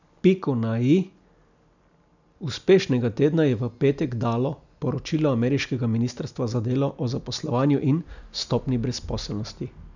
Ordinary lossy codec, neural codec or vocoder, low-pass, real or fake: none; none; 7.2 kHz; real